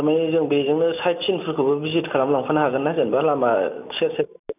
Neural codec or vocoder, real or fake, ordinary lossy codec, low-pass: none; real; none; 3.6 kHz